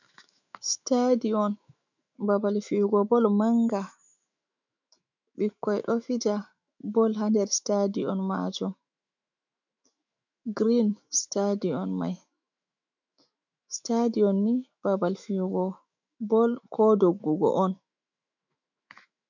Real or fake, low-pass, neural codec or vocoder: fake; 7.2 kHz; autoencoder, 48 kHz, 128 numbers a frame, DAC-VAE, trained on Japanese speech